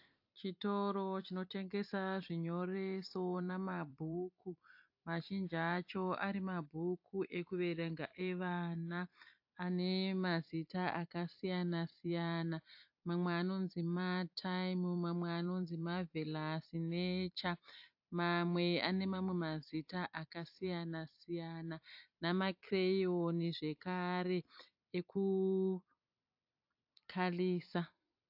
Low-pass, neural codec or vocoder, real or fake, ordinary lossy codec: 5.4 kHz; vocoder, 44.1 kHz, 128 mel bands every 256 samples, BigVGAN v2; fake; AAC, 48 kbps